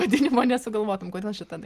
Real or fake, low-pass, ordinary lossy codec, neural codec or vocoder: real; 14.4 kHz; Opus, 24 kbps; none